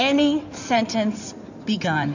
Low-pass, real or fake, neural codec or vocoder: 7.2 kHz; fake; codec, 16 kHz in and 24 kHz out, 2.2 kbps, FireRedTTS-2 codec